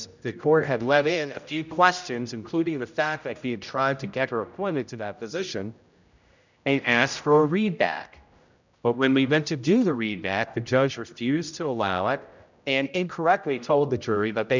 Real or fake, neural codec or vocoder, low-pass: fake; codec, 16 kHz, 0.5 kbps, X-Codec, HuBERT features, trained on general audio; 7.2 kHz